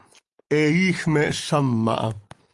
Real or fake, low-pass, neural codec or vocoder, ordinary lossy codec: real; 10.8 kHz; none; Opus, 32 kbps